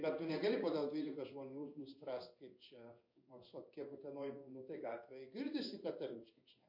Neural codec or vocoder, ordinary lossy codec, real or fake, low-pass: codec, 16 kHz in and 24 kHz out, 1 kbps, XY-Tokenizer; MP3, 32 kbps; fake; 5.4 kHz